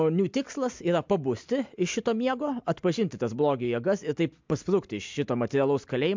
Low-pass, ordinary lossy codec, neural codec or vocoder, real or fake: 7.2 kHz; MP3, 64 kbps; none; real